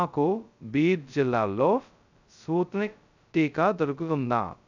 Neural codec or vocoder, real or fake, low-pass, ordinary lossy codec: codec, 16 kHz, 0.2 kbps, FocalCodec; fake; 7.2 kHz; none